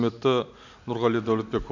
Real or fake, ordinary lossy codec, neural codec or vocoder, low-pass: real; none; none; 7.2 kHz